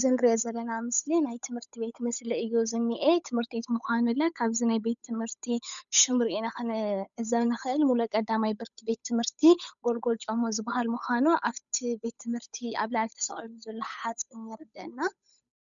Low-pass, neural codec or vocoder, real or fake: 7.2 kHz; codec, 16 kHz, 8 kbps, FunCodec, trained on Chinese and English, 25 frames a second; fake